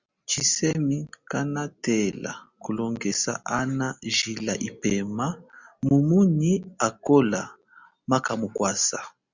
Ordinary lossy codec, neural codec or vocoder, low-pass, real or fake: Opus, 64 kbps; none; 7.2 kHz; real